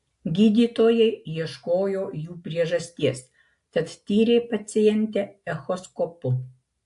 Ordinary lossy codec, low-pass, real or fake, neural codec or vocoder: AAC, 64 kbps; 10.8 kHz; real; none